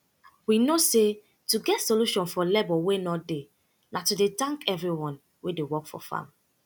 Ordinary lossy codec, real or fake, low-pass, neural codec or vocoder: none; real; none; none